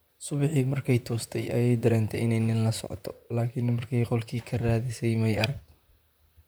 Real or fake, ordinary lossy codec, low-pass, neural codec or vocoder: fake; none; none; vocoder, 44.1 kHz, 128 mel bands, Pupu-Vocoder